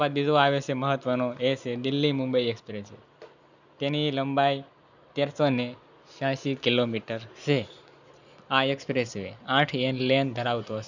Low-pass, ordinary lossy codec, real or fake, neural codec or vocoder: 7.2 kHz; none; real; none